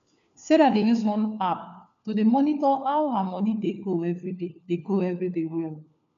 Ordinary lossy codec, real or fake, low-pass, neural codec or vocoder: none; fake; 7.2 kHz; codec, 16 kHz, 4 kbps, FunCodec, trained on LibriTTS, 50 frames a second